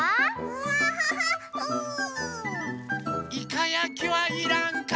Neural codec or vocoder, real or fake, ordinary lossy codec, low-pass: none; real; none; none